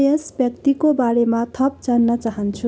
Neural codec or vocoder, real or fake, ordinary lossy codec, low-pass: none; real; none; none